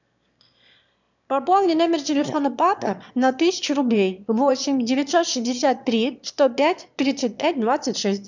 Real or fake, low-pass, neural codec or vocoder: fake; 7.2 kHz; autoencoder, 22.05 kHz, a latent of 192 numbers a frame, VITS, trained on one speaker